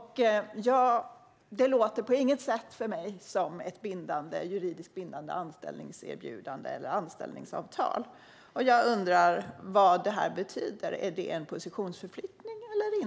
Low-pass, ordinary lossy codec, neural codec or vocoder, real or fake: none; none; none; real